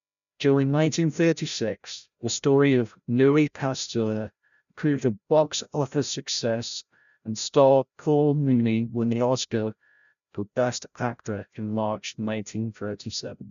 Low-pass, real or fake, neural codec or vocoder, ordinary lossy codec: 7.2 kHz; fake; codec, 16 kHz, 0.5 kbps, FreqCodec, larger model; none